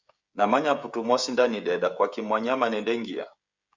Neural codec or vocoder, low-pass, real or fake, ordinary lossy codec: codec, 16 kHz, 16 kbps, FreqCodec, smaller model; 7.2 kHz; fake; Opus, 64 kbps